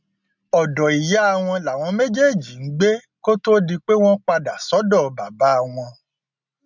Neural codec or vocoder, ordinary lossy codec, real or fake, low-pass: none; none; real; 7.2 kHz